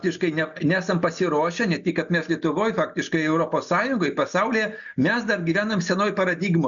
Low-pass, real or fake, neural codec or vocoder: 7.2 kHz; real; none